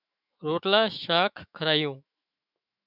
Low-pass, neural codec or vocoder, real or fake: 5.4 kHz; autoencoder, 48 kHz, 128 numbers a frame, DAC-VAE, trained on Japanese speech; fake